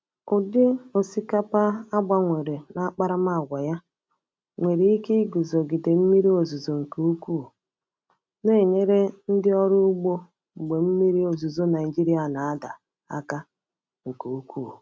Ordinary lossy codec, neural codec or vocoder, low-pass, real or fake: none; none; none; real